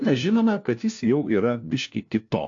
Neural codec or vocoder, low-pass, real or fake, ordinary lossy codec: codec, 16 kHz, 1 kbps, FunCodec, trained on LibriTTS, 50 frames a second; 7.2 kHz; fake; AAC, 64 kbps